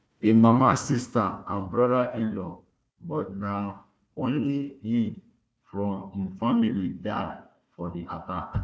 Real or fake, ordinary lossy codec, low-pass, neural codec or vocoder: fake; none; none; codec, 16 kHz, 1 kbps, FunCodec, trained on Chinese and English, 50 frames a second